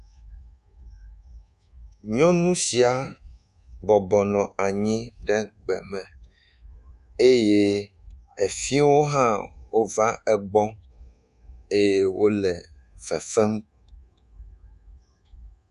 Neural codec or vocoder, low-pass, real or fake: codec, 24 kHz, 1.2 kbps, DualCodec; 10.8 kHz; fake